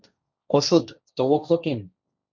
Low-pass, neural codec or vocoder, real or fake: 7.2 kHz; codec, 16 kHz, 1.1 kbps, Voila-Tokenizer; fake